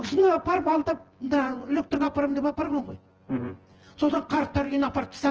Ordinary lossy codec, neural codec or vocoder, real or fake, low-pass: Opus, 16 kbps; vocoder, 24 kHz, 100 mel bands, Vocos; fake; 7.2 kHz